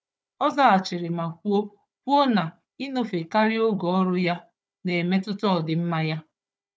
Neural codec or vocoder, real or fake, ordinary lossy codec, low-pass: codec, 16 kHz, 4 kbps, FunCodec, trained on Chinese and English, 50 frames a second; fake; none; none